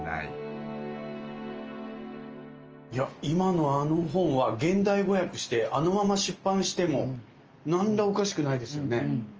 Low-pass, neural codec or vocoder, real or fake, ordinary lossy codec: 7.2 kHz; none; real; Opus, 24 kbps